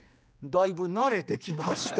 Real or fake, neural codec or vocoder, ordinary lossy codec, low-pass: fake; codec, 16 kHz, 2 kbps, X-Codec, HuBERT features, trained on general audio; none; none